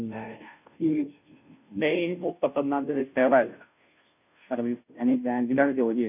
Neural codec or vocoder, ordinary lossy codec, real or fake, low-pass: codec, 16 kHz, 0.5 kbps, FunCodec, trained on Chinese and English, 25 frames a second; none; fake; 3.6 kHz